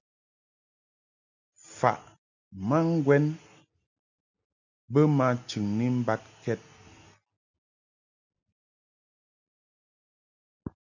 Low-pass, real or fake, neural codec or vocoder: 7.2 kHz; real; none